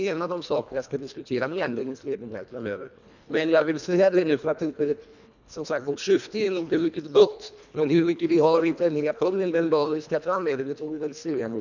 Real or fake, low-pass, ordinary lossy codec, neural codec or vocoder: fake; 7.2 kHz; none; codec, 24 kHz, 1.5 kbps, HILCodec